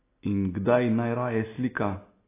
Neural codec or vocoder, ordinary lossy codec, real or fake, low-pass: none; AAC, 16 kbps; real; 3.6 kHz